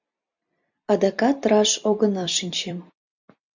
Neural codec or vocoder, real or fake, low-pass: vocoder, 44.1 kHz, 128 mel bands every 256 samples, BigVGAN v2; fake; 7.2 kHz